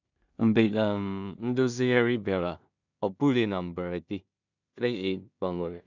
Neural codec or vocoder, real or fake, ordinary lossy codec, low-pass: codec, 16 kHz in and 24 kHz out, 0.4 kbps, LongCat-Audio-Codec, two codebook decoder; fake; none; 7.2 kHz